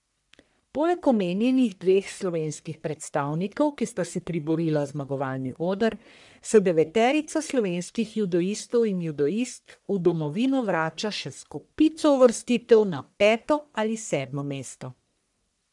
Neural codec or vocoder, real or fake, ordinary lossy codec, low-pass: codec, 44.1 kHz, 1.7 kbps, Pupu-Codec; fake; none; 10.8 kHz